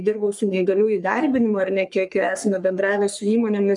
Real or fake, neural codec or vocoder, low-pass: fake; codec, 44.1 kHz, 3.4 kbps, Pupu-Codec; 10.8 kHz